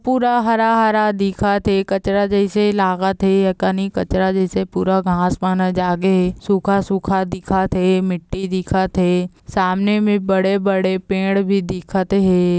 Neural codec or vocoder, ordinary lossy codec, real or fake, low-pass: none; none; real; none